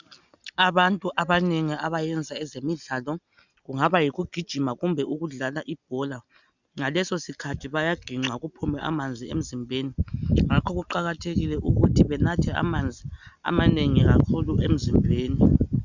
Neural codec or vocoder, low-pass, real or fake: none; 7.2 kHz; real